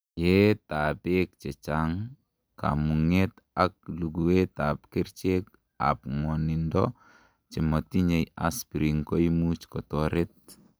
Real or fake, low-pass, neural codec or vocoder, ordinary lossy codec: real; none; none; none